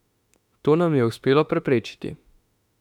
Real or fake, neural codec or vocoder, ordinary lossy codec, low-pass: fake; autoencoder, 48 kHz, 32 numbers a frame, DAC-VAE, trained on Japanese speech; none; 19.8 kHz